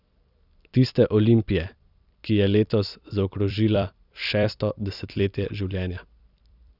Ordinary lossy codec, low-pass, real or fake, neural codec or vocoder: none; 5.4 kHz; fake; vocoder, 44.1 kHz, 128 mel bands every 256 samples, BigVGAN v2